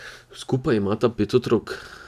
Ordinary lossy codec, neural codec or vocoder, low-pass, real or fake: none; none; 14.4 kHz; real